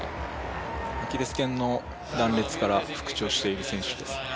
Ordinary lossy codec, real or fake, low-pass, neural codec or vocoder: none; real; none; none